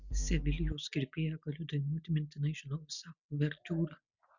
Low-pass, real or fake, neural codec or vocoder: 7.2 kHz; real; none